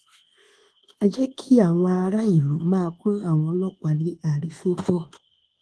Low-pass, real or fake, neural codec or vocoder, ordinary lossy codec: 10.8 kHz; fake; codec, 24 kHz, 1.2 kbps, DualCodec; Opus, 16 kbps